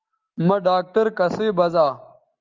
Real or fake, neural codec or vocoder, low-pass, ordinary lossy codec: real; none; 7.2 kHz; Opus, 32 kbps